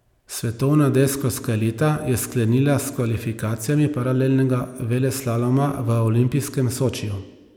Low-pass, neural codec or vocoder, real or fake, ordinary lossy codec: 19.8 kHz; none; real; none